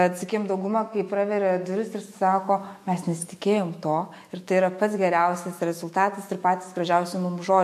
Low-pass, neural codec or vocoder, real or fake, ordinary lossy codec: 14.4 kHz; autoencoder, 48 kHz, 128 numbers a frame, DAC-VAE, trained on Japanese speech; fake; MP3, 64 kbps